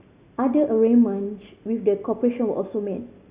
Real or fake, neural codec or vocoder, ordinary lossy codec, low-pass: real; none; Opus, 64 kbps; 3.6 kHz